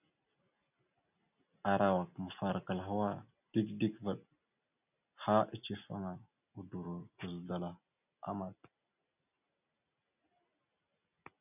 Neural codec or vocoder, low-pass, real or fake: none; 3.6 kHz; real